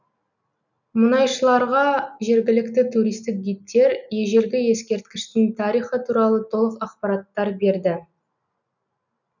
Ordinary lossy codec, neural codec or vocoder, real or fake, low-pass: none; none; real; 7.2 kHz